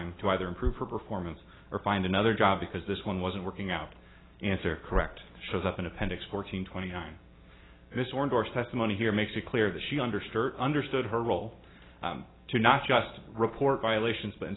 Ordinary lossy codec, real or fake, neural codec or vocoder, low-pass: AAC, 16 kbps; real; none; 7.2 kHz